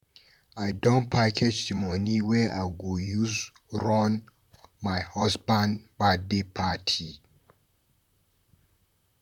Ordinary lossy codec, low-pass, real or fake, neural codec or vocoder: none; 19.8 kHz; fake; vocoder, 44.1 kHz, 128 mel bands, Pupu-Vocoder